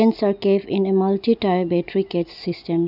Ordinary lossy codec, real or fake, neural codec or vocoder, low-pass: none; real; none; 5.4 kHz